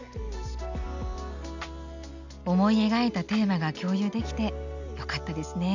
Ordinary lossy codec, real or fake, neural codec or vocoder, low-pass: none; real; none; 7.2 kHz